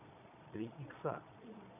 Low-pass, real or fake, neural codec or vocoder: 3.6 kHz; fake; vocoder, 22.05 kHz, 80 mel bands, Vocos